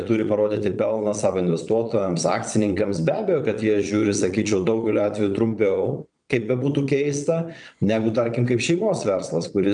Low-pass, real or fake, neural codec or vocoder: 9.9 kHz; fake; vocoder, 22.05 kHz, 80 mel bands, Vocos